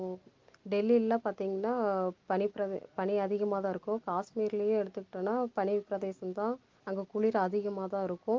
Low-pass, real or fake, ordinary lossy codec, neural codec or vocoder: 7.2 kHz; real; Opus, 32 kbps; none